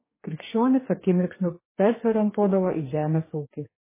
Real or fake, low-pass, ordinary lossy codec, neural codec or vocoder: fake; 3.6 kHz; MP3, 16 kbps; codec, 44.1 kHz, 2.6 kbps, DAC